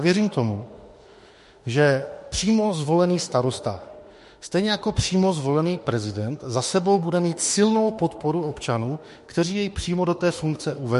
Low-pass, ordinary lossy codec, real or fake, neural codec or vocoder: 14.4 kHz; MP3, 48 kbps; fake; autoencoder, 48 kHz, 32 numbers a frame, DAC-VAE, trained on Japanese speech